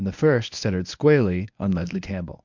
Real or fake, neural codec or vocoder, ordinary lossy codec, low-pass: fake; codec, 24 kHz, 0.9 kbps, WavTokenizer, medium speech release version 1; MP3, 64 kbps; 7.2 kHz